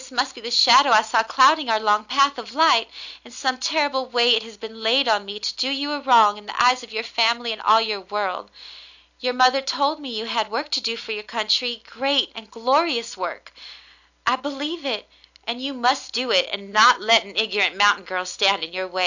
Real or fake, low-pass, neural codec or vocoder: real; 7.2 kHz; none